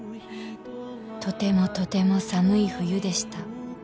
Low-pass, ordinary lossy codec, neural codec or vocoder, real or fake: none; none; none; real